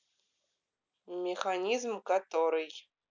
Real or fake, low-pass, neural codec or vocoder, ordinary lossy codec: real; 7.2 kHz; none; none